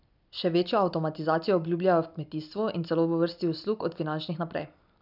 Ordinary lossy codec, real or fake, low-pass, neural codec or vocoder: none; real; 5.4 kHz; none